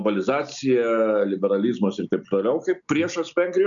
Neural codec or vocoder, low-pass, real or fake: none; 7.2 kHz; real